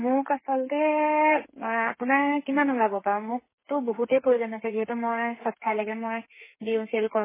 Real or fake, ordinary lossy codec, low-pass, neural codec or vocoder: fake; MP3, 16 kbps; 3.6 kHz; codec, 32 kHz, 1.9 kbps, SNAC